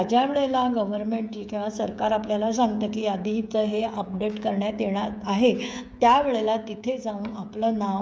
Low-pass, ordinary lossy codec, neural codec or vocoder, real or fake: none; none; codec, 16 kHz, 16 kbps, FreqCodec, smaller model; fake